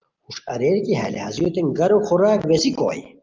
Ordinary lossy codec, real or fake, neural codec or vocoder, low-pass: Opus, 32 kbps; real; none; 7.2 kHz